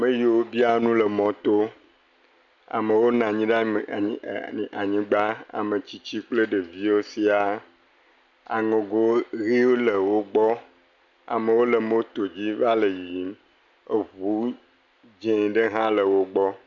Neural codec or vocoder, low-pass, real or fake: none; 7.2 kHz; real